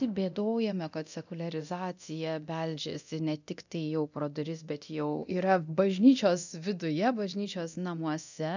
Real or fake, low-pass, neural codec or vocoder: fake; 7.2 kHz; codec, 24 kHz, 0.9 kbps, DualCodec